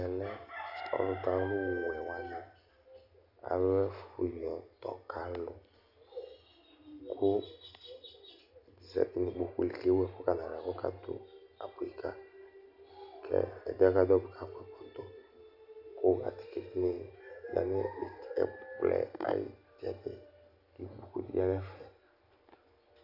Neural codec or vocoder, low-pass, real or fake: none; 5.4 kHz; real